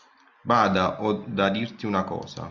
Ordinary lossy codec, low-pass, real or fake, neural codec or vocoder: Opus, 64 kbps; 7.2 kHz; real; none